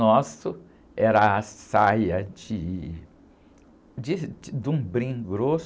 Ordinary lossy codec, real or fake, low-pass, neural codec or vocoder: none; real; none; none